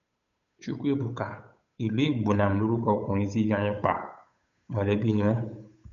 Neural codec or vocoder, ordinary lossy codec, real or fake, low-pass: codec, 16 kHz, 8 kbps, FunCodec, trained on Chinese and English, 25 frames a second; none; fake; 7.2 kHz